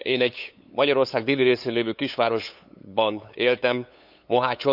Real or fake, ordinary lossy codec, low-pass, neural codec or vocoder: fake; none; 5.4 kHz; codec, 16 kHz, 8 kbps, FunCodec, trained on LibriTTS, 25 frames a second